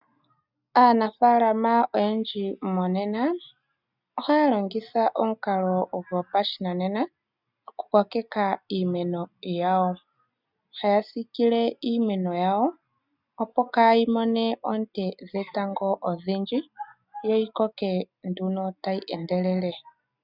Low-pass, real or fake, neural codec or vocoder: 5.4 kHz; real; none